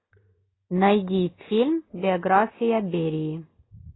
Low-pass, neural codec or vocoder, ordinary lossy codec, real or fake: 7.2 kHz; none; AAC, 16 kbps; real